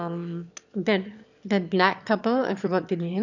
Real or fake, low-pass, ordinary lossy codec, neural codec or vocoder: fake; 7.2 kHz; none; autoencoder, 22.05 kHz, a latent of 192 numbers a frame, VITS, trained on one speaker